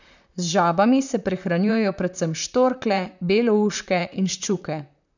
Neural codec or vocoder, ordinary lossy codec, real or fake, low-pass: vocoder, 44.1 kHz, 128 mel bands, Pupu-Vocoder; none; fake; 7.2 kHz